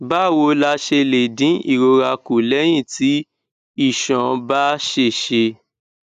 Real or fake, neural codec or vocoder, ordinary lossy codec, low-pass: real; none; none; 14.4 kHz